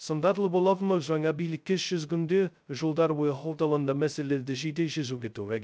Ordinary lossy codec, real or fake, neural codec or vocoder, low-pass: none; fake; codec, 16 kHz, 0.2 kbps, FocalCodec; none